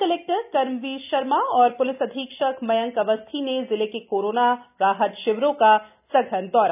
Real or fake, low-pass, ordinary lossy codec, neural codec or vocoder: real; 3.6 kHz; none; none